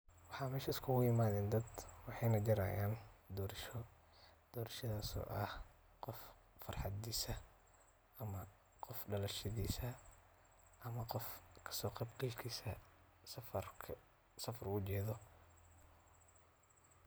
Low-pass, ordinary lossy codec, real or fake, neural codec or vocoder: none; none; real; none